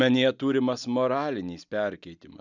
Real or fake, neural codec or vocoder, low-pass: real; none; 7.2 kHz